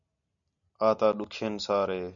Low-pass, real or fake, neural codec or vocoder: 7.2 kHz; real; none